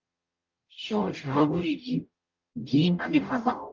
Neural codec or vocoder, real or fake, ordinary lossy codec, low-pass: codec, 44.1 kHz, 0.9 kbps, DAC; fake; Opus, 24 kbps; 7.2 kHz